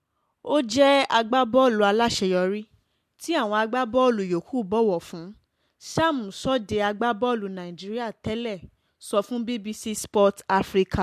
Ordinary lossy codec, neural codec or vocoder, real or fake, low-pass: MP3, 64 kbps; none; real; 14.4 kHz